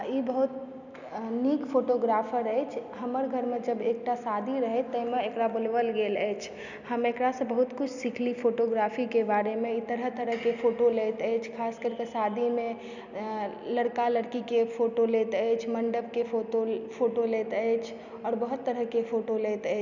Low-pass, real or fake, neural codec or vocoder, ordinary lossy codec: 7.2 kHz; real; none; none